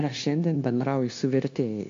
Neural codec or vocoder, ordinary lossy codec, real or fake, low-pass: codec, 16 kHz, 0.9 kbps, LongCat-Audio-Codec; AAC, 48 kbps; fake; 7.2 kHz